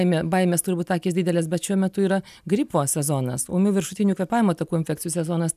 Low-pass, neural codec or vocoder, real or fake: 14.4 kHz; none; real